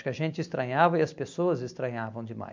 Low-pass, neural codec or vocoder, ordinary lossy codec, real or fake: 7.2 kHz; none; MP3, 64 kbps; real